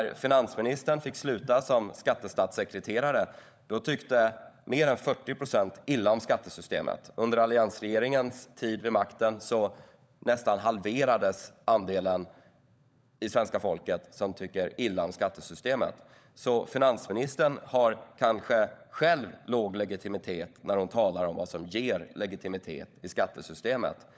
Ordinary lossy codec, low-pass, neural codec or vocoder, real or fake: none; none; codec, 16 kHz, 16 kbps, FunCodec, trained on LibriTTS, 50 frames a second; fake